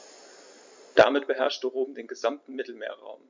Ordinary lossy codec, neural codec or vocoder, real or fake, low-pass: MP3, 64 kbps; none; real; 7.2 kHz